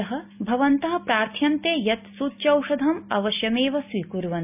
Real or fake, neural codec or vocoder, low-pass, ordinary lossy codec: real; none; 3.6 kHz; none